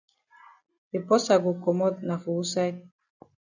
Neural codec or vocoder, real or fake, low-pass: none; real; 7.2 kHz